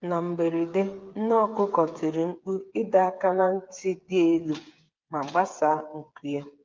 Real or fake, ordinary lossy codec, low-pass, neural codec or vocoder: fake; Opus, 24 kbps; 7.2 kHz; codec, 16 kHz, 8 kbps, FreqCodec, smaller model